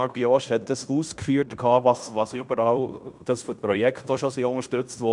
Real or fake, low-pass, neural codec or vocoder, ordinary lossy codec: fake; 10.8 kHz; codec, 16 kHz in and 24 kHz out, 0.9 kbps, LongCat-Audio-Codec, fine tuned four codebook decoder; none